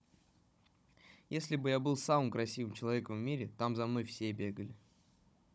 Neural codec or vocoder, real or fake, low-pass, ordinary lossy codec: codec, 16 kHz, 16 kbps, FunCodec, trained on Chinese and English, 50 frames a second; fake; none; none